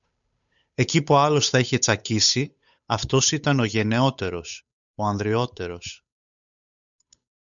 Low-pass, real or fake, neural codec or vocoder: 7.2 kHz; fake; codec, 16 kHz, 8 kbps, FunCodec, trained on Chinese and English, 25 frames a second